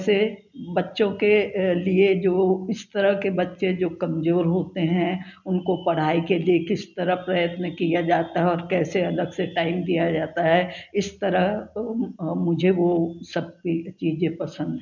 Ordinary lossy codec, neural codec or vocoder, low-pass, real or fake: none; none; 7.2 kHz; real